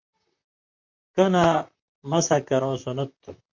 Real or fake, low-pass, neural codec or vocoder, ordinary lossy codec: fake; 7.2 kHz; vocoder, 44.1 kHz, 128 mel bands, Pupu-Vocoder; MP3, 48 kbps